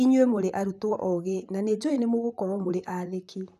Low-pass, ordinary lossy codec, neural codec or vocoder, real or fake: 14.4 kHz; none; vocoder, 44.1 kHz, 128 mel bands, Pupu-Vocoder; fake